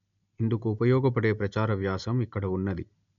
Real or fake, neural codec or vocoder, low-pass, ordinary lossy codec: real; none; 7.2 kHz; none